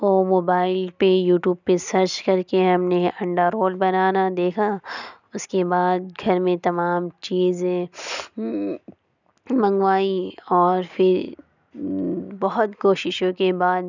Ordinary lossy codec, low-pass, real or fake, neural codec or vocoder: none; 7.2 kHz; real; none